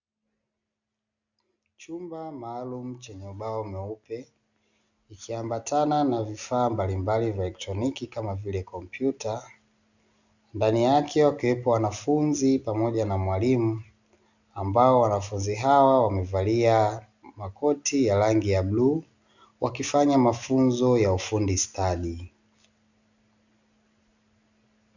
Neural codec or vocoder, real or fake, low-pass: none; real; 7.2 kHz